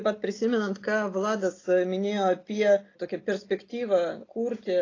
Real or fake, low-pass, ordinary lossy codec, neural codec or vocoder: real; 7.2 kHz; AAC, 32 kbps; none